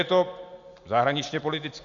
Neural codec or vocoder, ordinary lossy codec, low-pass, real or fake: none; Opus, 64 kbps; 7.2 kHz; real